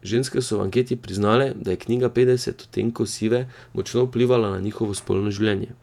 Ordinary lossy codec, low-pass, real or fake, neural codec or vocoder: none; 19.8 kHz; fake; vocoder, 48 kHz, 128 mel bands, Vocos